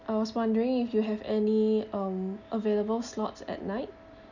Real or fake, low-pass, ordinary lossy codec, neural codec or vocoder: real; 7.2 kHz; none; none